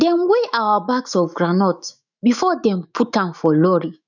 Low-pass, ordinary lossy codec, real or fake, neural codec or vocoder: 7.2 kHz; none; fake; autoencoder, 48 kHz, 128 numbers a frame, DAC-VAE, trained on Japanese speech